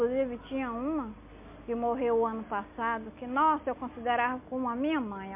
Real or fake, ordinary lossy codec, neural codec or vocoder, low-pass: real; MP3, 32 kbps; none; 3.6 kHz